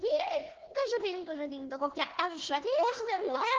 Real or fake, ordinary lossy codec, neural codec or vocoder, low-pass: fake; Opus, 16 kbps; codec, 16 kHz, 1 kbps, FunCodec, trained on Chinese and English, 50 frames a second; 7.2 kHz